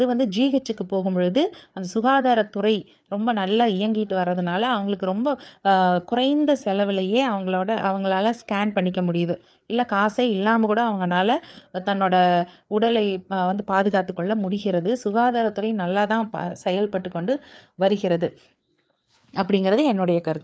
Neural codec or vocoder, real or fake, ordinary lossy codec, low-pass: codec, 16 kHz, 4 kbps, FreqCodec, larger model; fake; none; none